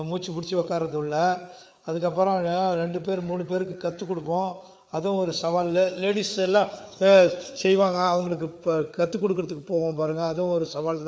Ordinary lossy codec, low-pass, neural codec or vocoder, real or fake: none; none; codec, 16 kHz, 4 kbps, FreqCodec, larger model; fake